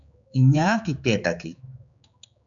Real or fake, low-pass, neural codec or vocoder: fake; 7.2 kHz; codec, 16 kHz, 4 kbps, X-Codec, HuBERT features, trained on general audio